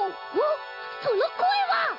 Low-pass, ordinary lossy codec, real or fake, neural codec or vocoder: 5.4 kHz; AAC, 32 kbps; real; none